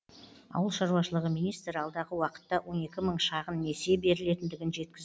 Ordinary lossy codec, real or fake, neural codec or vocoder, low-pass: none; real; none; none